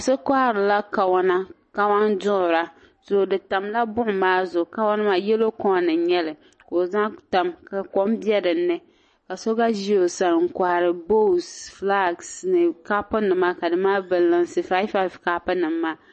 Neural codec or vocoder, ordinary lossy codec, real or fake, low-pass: none; MP3, 32 kbps; real; 10.8 kHz